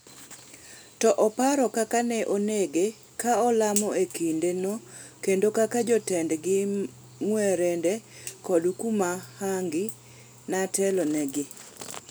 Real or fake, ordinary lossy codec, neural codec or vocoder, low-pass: real; none; none; none